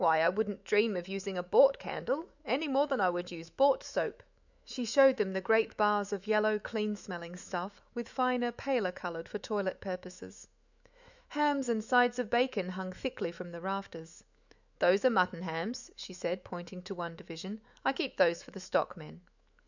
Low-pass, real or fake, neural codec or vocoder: 7.2 kHz; fake; autoencoder, 48 kHz, 128 numbers a frame, DAC-VAE, trained on Japanese speech